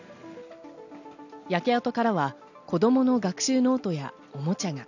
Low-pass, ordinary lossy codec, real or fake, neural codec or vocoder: 7.2 kHz; none; real; none